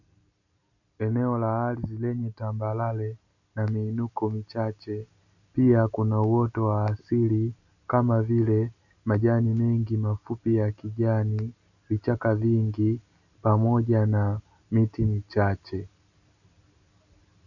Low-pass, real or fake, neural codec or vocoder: 7.2 kHz; real; none